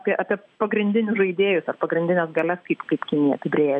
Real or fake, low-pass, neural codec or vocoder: real; 9.9 kHz; none